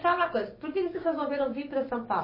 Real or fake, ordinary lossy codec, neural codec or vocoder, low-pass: fake; MP3, 24 kbps; codec, 16 kHz, 6 kbps, DAC; 5.4 kHz